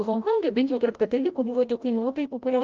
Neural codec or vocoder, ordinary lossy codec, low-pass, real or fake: codec, 16 kHz, 0.5 kbps, FreqCodec, larger model; Opus, 32 kbps; 7.2 kHz; fake